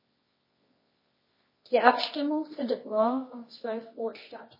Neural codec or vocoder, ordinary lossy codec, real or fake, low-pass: codec, 24 kHz, 0.9 kbps, WavTokenizer, medium music audio release; MP3, 24 kbps; fake; 5.4 kHz